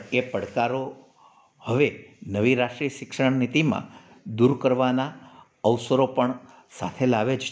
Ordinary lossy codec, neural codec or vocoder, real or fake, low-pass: none; none; real; none